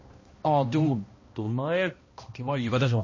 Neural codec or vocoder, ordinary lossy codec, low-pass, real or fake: codec, 16 kHz, 1 kbps, X-Codec, HuBERT features, trained on balanced general audio; MP3, 32 kbps; 7.2 kHz; fake